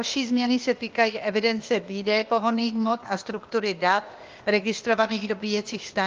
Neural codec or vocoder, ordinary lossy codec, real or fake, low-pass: codec, 16 kHz, 0.8 kbps, ZipCodec; Opus, 32 kbps; fake; 7.2 kHz